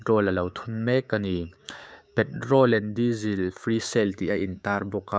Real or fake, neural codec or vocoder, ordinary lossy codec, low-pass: fake; codec, 16 kHz, 6 kbps, DAC; none; none